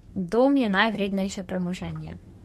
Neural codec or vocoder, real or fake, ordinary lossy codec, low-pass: codec, 32 kHz, 1.9 kbps, SNAC; fake; MP3, 64 kbps; 14.4 kHz